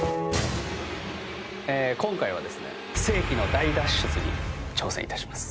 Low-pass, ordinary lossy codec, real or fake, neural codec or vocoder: none; none; real; none